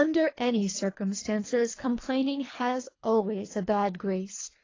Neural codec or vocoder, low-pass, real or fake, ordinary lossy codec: codec, 24 kHz, 3 kbps, HILCodec; 7.2 kHz; fake; AAC, 32 kbps